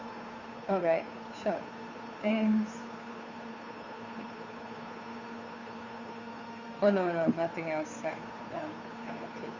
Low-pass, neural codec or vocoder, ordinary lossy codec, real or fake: 7.2 kHz; codec, 16 kHz, 2 kbps, FunCodec, trained on Chinese and English, 25 frames a second; MP3, 64 kbps; fake